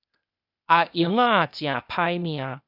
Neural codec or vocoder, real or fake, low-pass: codec, 16 kHz, 0.8 kbps, ZipCodec; fake; 5.4 kHz